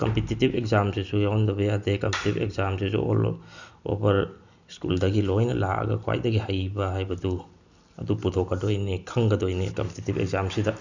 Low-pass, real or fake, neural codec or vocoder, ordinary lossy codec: 7.2 kHz; real; none; none